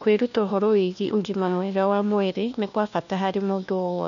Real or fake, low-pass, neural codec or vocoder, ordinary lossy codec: fake; 7.2 kHz; codec, 16 kHz, 1 kbps, FunCodec, trained on LibriTTS, 50 frames a second; none